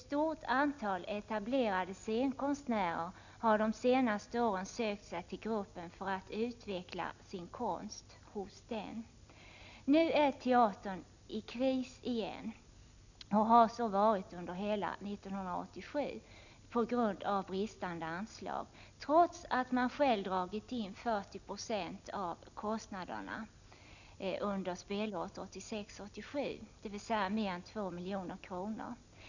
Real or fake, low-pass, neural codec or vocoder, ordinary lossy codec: real; 7.2 kHz; none; none